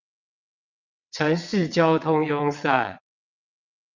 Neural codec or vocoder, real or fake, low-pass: vocoder, 22.05 kHz, 80 mel bands, WaveNeXt; fake; 7.2 kHz